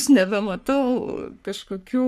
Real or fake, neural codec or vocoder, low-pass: fake; codec, 44.1 kHz, 3.4 kbps, Pupu-Codec; 14.4 kHz